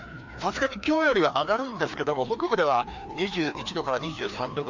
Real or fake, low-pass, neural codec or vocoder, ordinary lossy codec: fake; 7.2 kHz; codec, 16 kHz, 2 kbps, FreqCodec, larger model; MP3, 64 kbps